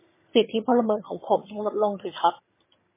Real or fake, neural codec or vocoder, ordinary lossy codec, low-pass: fake; vocoder, 22.05 kHz, 80 mel bands, HiFi-GAN; MP3, 16 kbps; 3.6 kHz